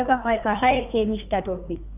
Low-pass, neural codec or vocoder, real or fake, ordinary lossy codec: 3.6 kHz; codec, 24 kHz, 1 kbps, SNAC; fake; none